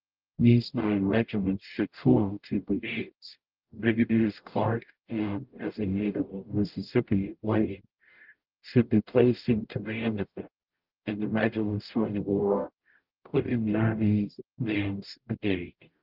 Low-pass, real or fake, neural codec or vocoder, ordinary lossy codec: 5.4 kHz; fake; codec, 44.1 kHz, 0.9 kbps, DAC; Opus, 32 kbps